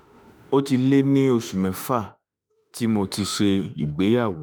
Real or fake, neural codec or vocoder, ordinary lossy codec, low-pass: fake; autoencoder, 48 kHz, 32 numbers a frame, DAC-VAE, trained on Japanese speech; none; none